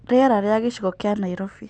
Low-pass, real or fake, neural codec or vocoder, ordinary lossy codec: 9.9 kHz; real; none; none